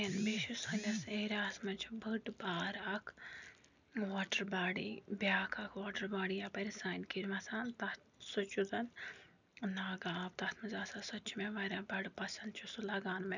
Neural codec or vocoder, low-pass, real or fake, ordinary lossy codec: none; 7.2 kHz; real; none